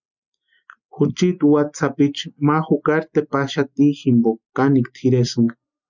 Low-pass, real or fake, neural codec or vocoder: 7.2 kHz; real; none